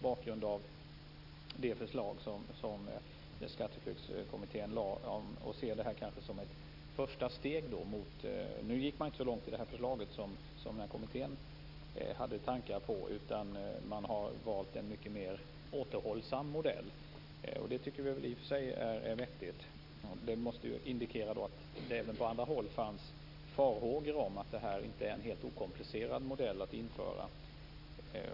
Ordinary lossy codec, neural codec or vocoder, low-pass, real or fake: none; none; 5.4 kHz; real